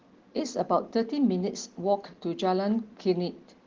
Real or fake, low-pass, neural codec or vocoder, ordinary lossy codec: real; 7.2 kHz; none; Opus, 16 kbps